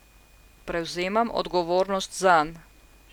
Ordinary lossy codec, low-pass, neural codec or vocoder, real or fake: none; 19.8 kHz; none; real